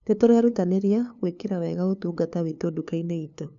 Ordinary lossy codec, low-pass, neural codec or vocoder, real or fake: none; 7.2 kHz; codec, 16 kHz, 2 kbps, FunCodec, trained on LibriTTS, 25 frames a second; fake